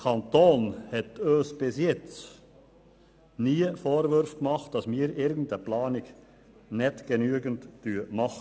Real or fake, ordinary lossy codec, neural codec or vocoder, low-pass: real; none; none; none